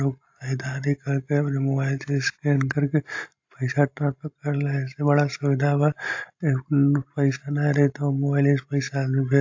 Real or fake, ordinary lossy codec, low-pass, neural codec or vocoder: real; AAC, 48 kbps; 7.2 kHz; none